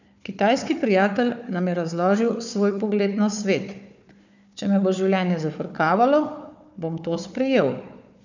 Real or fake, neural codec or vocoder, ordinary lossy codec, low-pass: fake; codec, 16 kHz, 4 kbps, FunCodec, trained on Chinese and English, 50 frames a second; none; 7.2 kHz